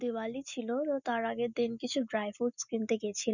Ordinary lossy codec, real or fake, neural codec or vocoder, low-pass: none; real; none; 7.2 kHz